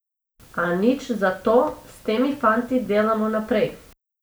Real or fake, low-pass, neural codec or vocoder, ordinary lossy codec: real; none; none; none